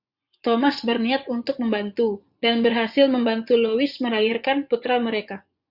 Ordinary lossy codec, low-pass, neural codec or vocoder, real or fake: Opus, 64 kbps; 5.4 kHz; vocoder, 24 kHz, 100 mel bands, Vocos; fake